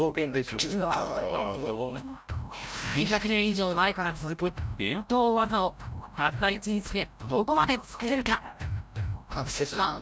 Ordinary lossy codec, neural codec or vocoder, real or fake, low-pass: none; codec, 16 kHz, 0.5 kbps, FreqCodec, larger model; fake; none